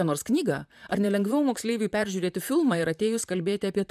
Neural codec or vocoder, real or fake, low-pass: vocoder, 44.1 kHz, 128 mel bands, Pupu-Vocoder; fake; 14.4 kHz